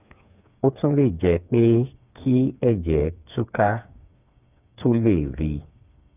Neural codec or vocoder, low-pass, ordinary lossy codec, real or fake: codec, 16 kHz, 4 kbps, FreqCodec, smaller model; 3.6 kHz; none; fake